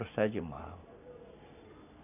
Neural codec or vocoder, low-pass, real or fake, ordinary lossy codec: none; 3.6 kHz; real; none